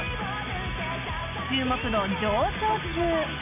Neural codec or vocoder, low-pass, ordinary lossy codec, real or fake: none; 3.6 kHz; none; real